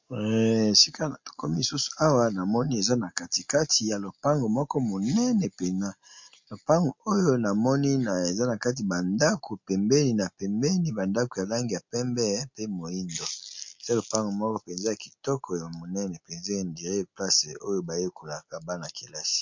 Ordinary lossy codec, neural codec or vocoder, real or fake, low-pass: MP3, 48 kbps; none; real; 7.2 kHz